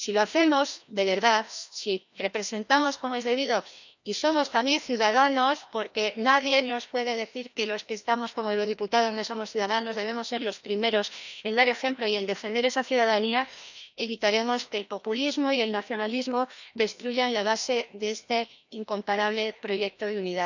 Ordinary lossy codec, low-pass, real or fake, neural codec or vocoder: none; 7.2 kHz; fake; codec, 16 kHz, 1 kbps, FreqCodec, larger model